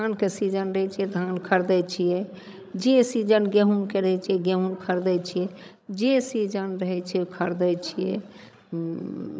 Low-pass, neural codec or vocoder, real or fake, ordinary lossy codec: none; codec, 16 kHz, 16 kbps, FreqCodec, larger model; fake; none